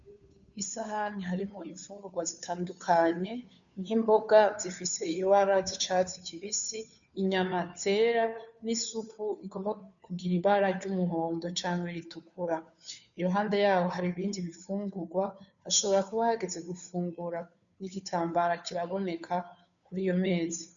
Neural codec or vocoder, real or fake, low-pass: codec, 16 kHz, 2 kbps, FunCodec, trained on Chinese and English, 25 frames a second; fake; 7.2 kHz